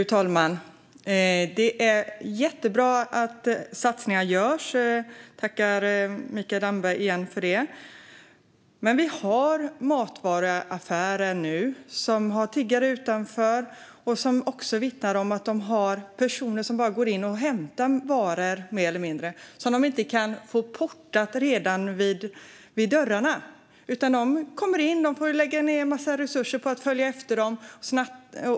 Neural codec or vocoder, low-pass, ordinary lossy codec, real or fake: none; none; none; real